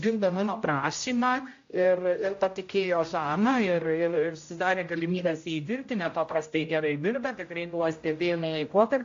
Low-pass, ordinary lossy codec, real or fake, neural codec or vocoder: 7.2 kHz; AAC, 48 kbps; fake; codec, 16 kHz, 0.5 kbps, X-Codec, HuBERT features, trained on general audio